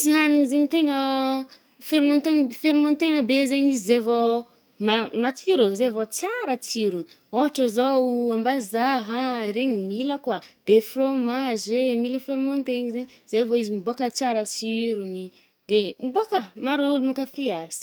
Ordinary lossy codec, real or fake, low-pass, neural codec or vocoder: none; fake; none; codec, 44.1 kHz, 2.6 kbps, SNAC